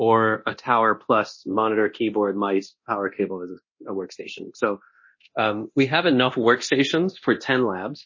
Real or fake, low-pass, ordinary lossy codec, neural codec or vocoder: fake; 7.2 kHz; MP3, 32 kbps; codec, 24 kHz, 0.9 kbps, DualCodec